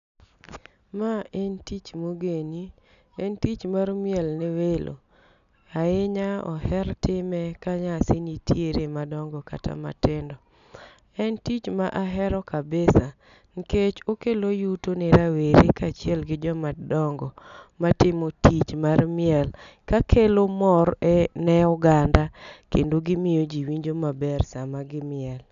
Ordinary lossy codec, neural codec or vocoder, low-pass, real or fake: none; none; 7.2 kHz; real